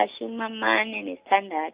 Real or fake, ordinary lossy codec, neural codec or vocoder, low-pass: real; Opus, 64 kbps; none; 3.6 kHz